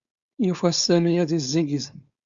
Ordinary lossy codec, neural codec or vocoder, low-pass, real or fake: Opus, 64 kbps; codec, 16 kHz, 4.8 kbps, FACodec; 7.2 kHz; fake